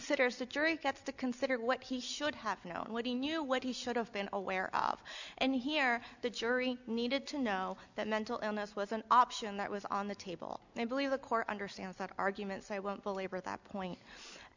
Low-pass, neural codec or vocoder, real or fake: 7.2 kHz; none; real